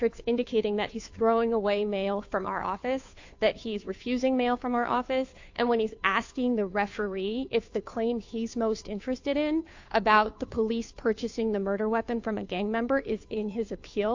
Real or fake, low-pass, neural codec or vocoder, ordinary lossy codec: fake; 7.2 kHz; codec, 16 kHz, 2 kbps, FunCodec, trained on Chinese and English, 25 frames a second; AAC, 48 kbps